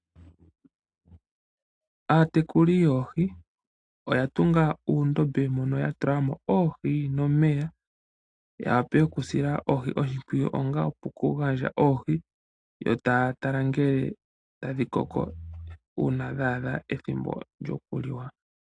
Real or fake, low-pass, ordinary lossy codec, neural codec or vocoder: real; 9.9 kHz; AAC, 48 kbps; none